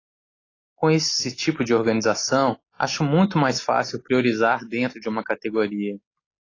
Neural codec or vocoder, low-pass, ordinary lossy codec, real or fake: none; 7.2 kHz; AAC, 32 kbps; real